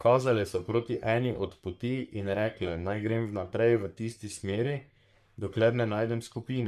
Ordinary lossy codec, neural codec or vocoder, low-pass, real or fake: MP3, 96 kbps; codec, 44.1 kHz, 3.4 kbps, Pupu-Codec; 14.4 kHz; fake